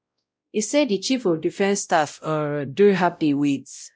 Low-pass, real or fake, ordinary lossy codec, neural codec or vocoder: none; fake; none; codec, 16 kHz, 0.5 kbps, X-Codec, WavLM features, trained on Multilingual LibriSpeech